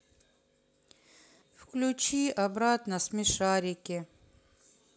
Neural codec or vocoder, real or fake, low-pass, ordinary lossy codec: none; real; none; none